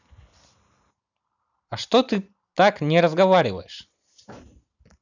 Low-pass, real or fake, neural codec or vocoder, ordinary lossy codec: 7.2 kHz; real; none; none